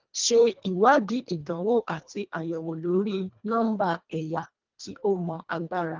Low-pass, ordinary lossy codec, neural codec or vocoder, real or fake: 7.2 kHz; Opus, 24 kbps; codec, 24 kHz, 1.5 kbps, HILCodec; fake